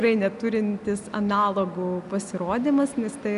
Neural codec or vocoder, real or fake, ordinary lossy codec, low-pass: none; real; MP3, 96 kbps; 10.8 kHz